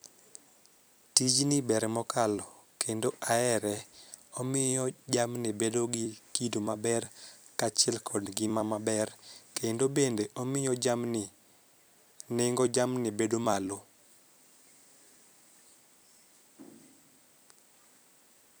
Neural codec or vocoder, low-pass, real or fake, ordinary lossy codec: vocoder, 44.1 kHz, 128 mel bands every 256 samples, BigVGAN v2; none; fake; none